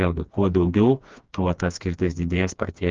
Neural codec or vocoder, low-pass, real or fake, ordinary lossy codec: codec, 16 kHz, 2 kbps, FreqCodec, smaller model; 7.2 kHz; fake; Opus, 24 kbps